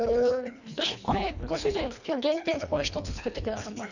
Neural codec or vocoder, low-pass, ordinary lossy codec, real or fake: codec, 24 kHz, 1.5 kbps, HILCodec; 7.2 kHz; none; fake